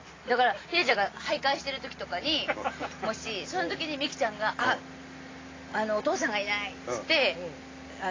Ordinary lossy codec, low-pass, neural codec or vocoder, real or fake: AAC, 32 kbps; 7.2 kHz; none; real